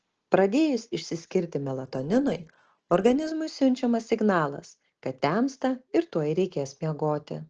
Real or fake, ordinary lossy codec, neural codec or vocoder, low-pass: real; Opus, 16 kbps; none; 7.2 kHz